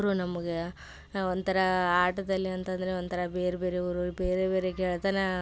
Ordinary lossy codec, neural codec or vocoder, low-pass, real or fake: none; none; none; real